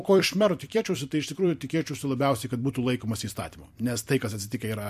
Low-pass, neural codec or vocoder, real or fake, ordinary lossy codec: 14.4 kHz; vocoder, 44.1 kHz, 128 mel bands every 256 samples, BigVGAN v2; fake; MP3, 64 kbps